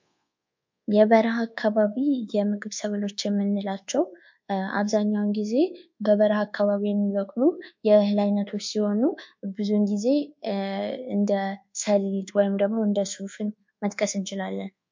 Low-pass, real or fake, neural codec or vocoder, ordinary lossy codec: 7.2 kHz; fake; codec, 24 kHz, 1.2 kbps, DualCodec; MP3, 48 kbps